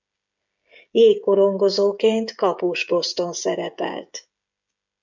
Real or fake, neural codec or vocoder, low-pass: fake; codec, 16 kHz, 16 kbps, FreqCodec, smaller model; 7.2 kHz